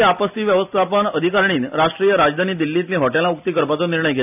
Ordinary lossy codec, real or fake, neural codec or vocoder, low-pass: none; real; none; 3.6 kHz